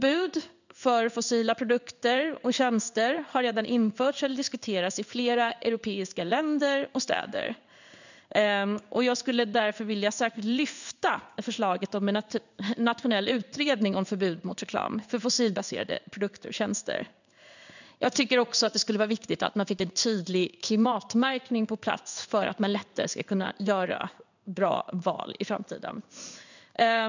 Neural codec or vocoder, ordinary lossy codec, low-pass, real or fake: codec, 16 kHz in and 24 kHz out, 1 kbps, XY-Tokenizer; none; 7.2 kHz; fake